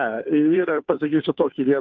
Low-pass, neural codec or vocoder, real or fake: 7.2 kHz; codec, 16 kHz, 2 kbps, FunCodec, trained on Chinese and English, 25 frames a second; fake